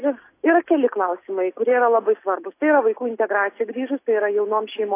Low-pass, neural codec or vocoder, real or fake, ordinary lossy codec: 3.6 kHz; none; real; AAC, 24 kbps